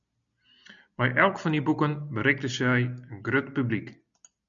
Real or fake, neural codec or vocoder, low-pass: real; none; 7.2 kHz